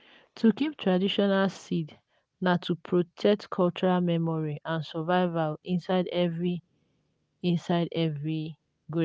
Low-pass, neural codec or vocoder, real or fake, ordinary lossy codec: none; none; real; none